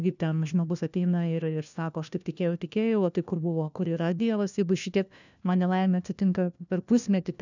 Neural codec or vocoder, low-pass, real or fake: codec, 16 kHz, 1 kbps, FunCodec, trained on LibriTTS, 50 frames a second; 7.2 kHz; fake